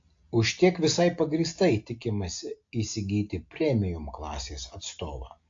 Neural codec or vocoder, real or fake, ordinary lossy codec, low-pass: none; real; AAC, 48 kbps; 7.2 kHz